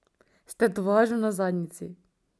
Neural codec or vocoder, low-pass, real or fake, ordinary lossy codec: none; none; real; none